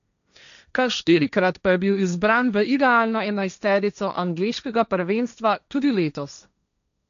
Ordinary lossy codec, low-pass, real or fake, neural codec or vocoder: none; 7.2 kHz; fake; codec, 16 kHz, 1.1 kbps, Voila-Tokenizer